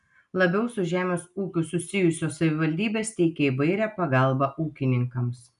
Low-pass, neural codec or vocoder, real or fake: 10.8 kHz; none; real